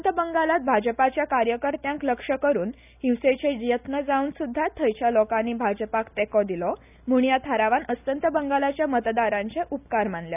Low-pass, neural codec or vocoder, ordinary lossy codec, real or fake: 3.6 kHz; none; none; real